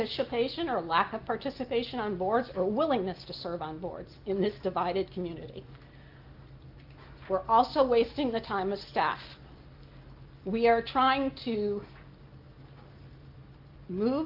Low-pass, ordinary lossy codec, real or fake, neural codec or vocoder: 5.4 kHz; Opus, 32 kbps; real; none